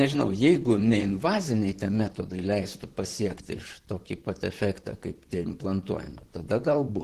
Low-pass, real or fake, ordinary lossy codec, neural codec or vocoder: 14.4 kHz; fake; Opus, 16 kbps; vocoder, 44.1 kHz, 128 mel bands, Pupu-Vocoder